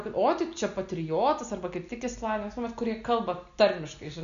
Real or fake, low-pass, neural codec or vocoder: real; 7.2 kHz; none